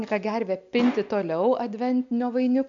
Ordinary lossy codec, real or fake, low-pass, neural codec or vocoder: MP3, 64 kbps; real; 7.2 kHz; none